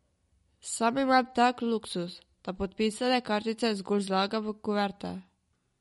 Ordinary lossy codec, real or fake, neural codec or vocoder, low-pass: MP3, 48 kbps; real; none; 19.8 kHz